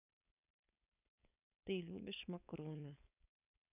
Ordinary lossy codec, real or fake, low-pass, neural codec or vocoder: none; fake; 3.6 kHz; codec, 16 kHz, 4.8 kbps, FACodec